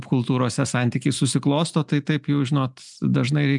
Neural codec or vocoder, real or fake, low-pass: none; real; 10.8 kHz